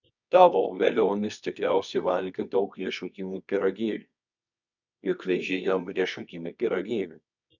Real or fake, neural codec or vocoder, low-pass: fake; codec, 24 kHz, 0.9 kbps, WavTokenizer, medium music audio release; 7.2 kHz